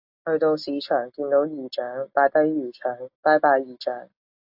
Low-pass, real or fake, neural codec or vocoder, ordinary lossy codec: 5.4 kHz; real; none; AAC, 48 kbps